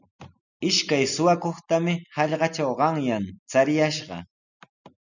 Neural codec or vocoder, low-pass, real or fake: none; 7.2 kHz; real